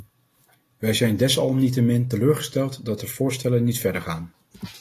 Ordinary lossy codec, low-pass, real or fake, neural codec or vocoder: AAC, 48 kbps; 14.4 kHz; real; none